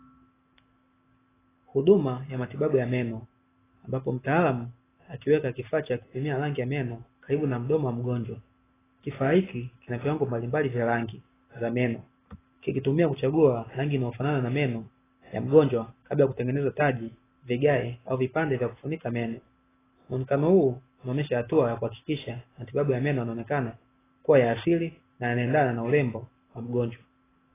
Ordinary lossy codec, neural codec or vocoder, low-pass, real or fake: AAC, 16 kbps; none; 3.6 kHz; real